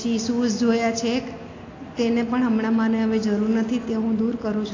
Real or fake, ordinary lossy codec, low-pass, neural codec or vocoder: real; AAC, 32 kbps; 7.2 kHz; none